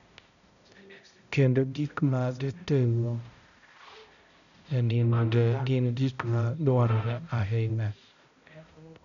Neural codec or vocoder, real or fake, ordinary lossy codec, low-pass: codec, 16 kHz, 0.5 kbps, X-Codec, HuBERT features, trained on balanced general audio; fake; MP3, 96 kbps; 7.2 kHz